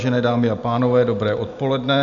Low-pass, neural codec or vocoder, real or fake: 7.2 kHz; none; real